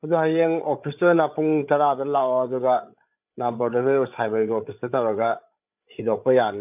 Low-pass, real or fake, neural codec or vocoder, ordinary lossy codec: 3.6 kHz; fake; codec, 16 kHz, 8 kbps, FreqCodec, larger model; none